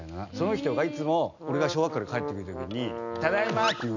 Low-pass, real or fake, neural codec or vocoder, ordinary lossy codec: 7.2 kHz; real; none; none